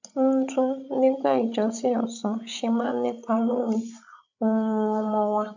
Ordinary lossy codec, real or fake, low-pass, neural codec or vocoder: none; fake; 7.2 kHz; codec, 16 kHz, 16 kbps, FreqCodec, larger model